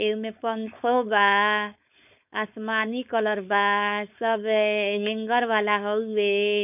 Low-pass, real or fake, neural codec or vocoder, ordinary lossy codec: 3.6 kHz; fake; codec, 16 kHz, 4.8 kbps, FACodec; none